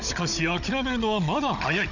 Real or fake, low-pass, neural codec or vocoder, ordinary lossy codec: fake; 7.2 kHz; codec, 16 kHz, 16 kbps, FunCodec, trained on Chinese and English, 50 frames a second; none